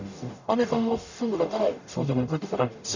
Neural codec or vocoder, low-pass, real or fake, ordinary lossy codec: codec, 44.1 kHz, 0.9 kbps, DAC; 7.2 kHz; fake; none